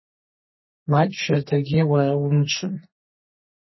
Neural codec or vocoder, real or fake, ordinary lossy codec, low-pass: codec, 32 kHz, 1.9 kbps, SNAC; fake; MP3, 24 kbps; 7.2 kHz